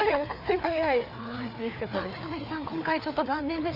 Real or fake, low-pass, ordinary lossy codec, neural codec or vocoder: fake; 5.4 kHz; none; codec, 16 kHz, 4 kbps, FreqCodec, larger model